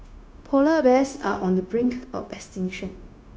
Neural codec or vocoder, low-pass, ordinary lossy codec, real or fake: codec, 16 kHz, 0.9 kbps, LongCat-Audio-Codec; none; none; fake